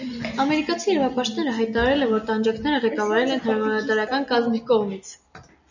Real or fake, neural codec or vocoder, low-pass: real; none; 7.2 kHz